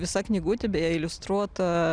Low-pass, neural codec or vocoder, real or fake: 9.9 kHz; none; real